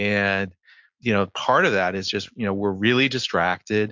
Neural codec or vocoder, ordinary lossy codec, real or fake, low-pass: none; MP3, 48 kbps; real; 7.2 kHz